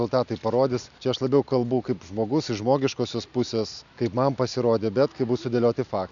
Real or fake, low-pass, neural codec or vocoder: real; 7.2 kHz; none